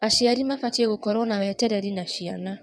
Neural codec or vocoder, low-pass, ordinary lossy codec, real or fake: vocoder, 22.05 kHz, 80 mel bands, WaveNeXt; none; none; fake